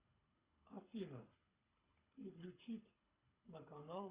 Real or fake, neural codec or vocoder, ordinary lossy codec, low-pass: fake; codec, 24 kHz, 6 kbps, HILCodec; Opus, 64 kbps; 3.6 kHz